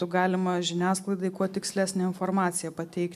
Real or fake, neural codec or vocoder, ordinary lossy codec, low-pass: real; none; MP3, 96 kbps; 14.4 kHz